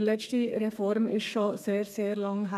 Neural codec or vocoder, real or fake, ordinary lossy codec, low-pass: codec, 32 kHz, 1.9 kbps, SNAC; fake; none; 14.4 kHz